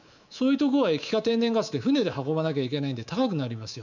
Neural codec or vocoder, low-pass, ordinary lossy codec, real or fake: codec, 24 kHz, 3.1 kbps, DualCodec; 7.2 kHz; none; fake